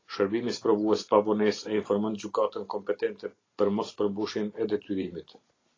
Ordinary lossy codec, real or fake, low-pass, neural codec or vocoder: AAC, 32 kbps; real; 7.2 kHz; none